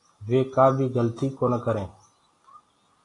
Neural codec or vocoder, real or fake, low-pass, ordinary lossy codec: none; real; 10.8 kHz; AAC, 32 kbps